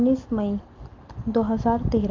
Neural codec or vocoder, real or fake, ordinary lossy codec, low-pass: none; real; Opus, 24 kbps; 7.2 kHz